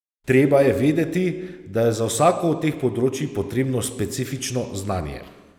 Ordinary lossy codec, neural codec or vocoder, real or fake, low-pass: none; none; real; 19.8 kHz